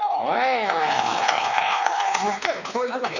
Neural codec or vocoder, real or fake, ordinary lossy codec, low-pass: codec, 16 kHz, 2 kbps, X-Codec, WavLM features, trained on Multilingual LibriSpeech; fake; none; 7.2 kHz